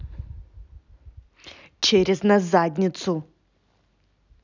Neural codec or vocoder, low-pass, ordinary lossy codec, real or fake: none; 7.2 kHz; none; real